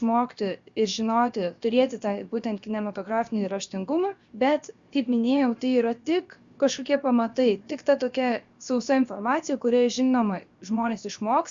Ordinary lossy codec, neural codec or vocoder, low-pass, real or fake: Opus, 64 kbps; codec, 16 kHz, 0.7 kbps, FocalCodec; 7.2 kHz; fake